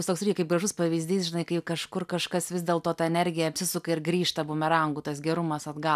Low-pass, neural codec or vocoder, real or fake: 14.4 kHz; none; real